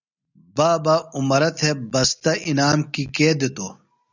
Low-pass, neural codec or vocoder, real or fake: 7.2 kHz; none; real